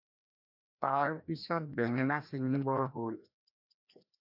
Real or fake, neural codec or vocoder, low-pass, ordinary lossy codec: fake; codec, 16 kHz, 1 kbps, FreqCodec, larger model; 5.4 kHz; AAC, 48 kbps